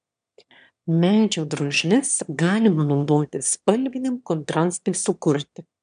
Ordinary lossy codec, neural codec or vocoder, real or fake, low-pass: MP3, 96 kbps; autoencoder, 22.05 kHz, a latent of 192 numbers a frame, VITS, trained on one speaker; fake; 9.9 kHz